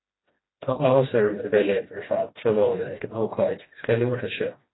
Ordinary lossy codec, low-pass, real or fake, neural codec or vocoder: AAC, 16 kbps; 7.2 kHz; fake; codec, 16 kHz, 1 kbps, FreqCodec, smaller model